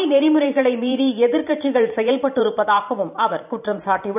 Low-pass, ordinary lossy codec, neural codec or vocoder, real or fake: 3.6 kHz; none; vocoder, 44.1 kHz, 128 mel bands every 512 samples, BigVGAN v2; fake